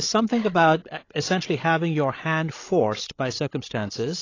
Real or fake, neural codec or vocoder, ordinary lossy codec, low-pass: fake; codec, 16 kHz, 16 kbps, FreqCodec, larger model; AAC, 32 kbps; 7.2 kHz